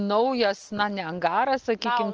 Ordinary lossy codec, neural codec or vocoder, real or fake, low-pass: Opus, 24 kbps; none; real; 7.2 kHz